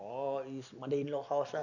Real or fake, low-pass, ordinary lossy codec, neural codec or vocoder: real; 7.2 kHz; none; none